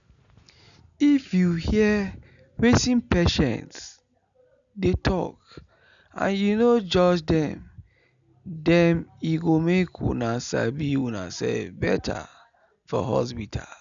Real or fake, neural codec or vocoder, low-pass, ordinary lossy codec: real; none; 7.2 kHz; none